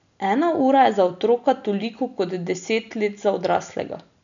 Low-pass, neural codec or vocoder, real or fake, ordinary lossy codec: 7.2 kHz; none; real; none